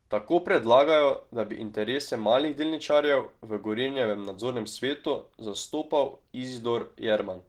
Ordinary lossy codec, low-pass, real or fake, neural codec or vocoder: Opus, 16 kbps; 19.8 kHz; real; none